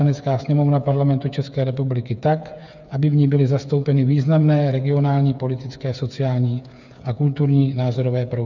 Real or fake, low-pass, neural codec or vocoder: fake; 7.2 kHz; codec, 16 kHz, 8 kbps, FreqCodec, smaller model